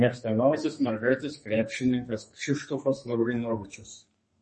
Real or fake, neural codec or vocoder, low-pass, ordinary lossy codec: fake; codec, 32 kHz, 1.9 kbps, SNAC; 10.8 kHz; MP3, 32 kbps